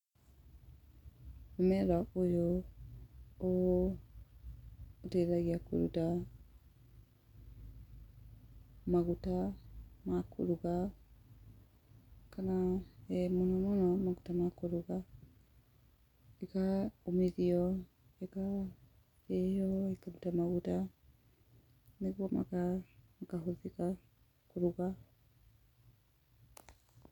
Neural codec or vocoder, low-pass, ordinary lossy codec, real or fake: none; 19.8 kHz; none; real